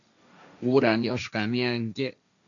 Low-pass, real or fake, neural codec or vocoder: 7.2 kHz; fake; codec, 16 kHz, 1.1 kbps, Voila-Tokenizer